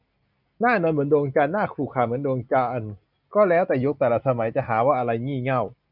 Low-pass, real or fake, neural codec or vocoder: 5.4 kHz; real; none